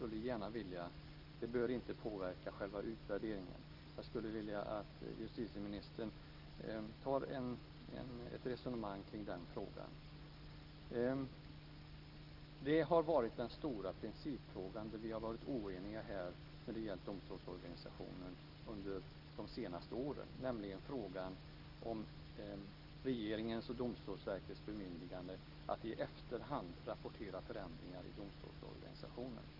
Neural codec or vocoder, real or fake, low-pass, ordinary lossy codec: none; real; 5.4 kHz; Opus, 32 kbps